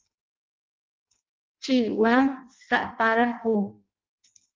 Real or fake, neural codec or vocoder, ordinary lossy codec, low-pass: fake; codec, 16 kHz in and 24 kHz out, 0.6 kbps, FireRedTTS-2 codec; Opus, 24 kbps; 7.2 kHz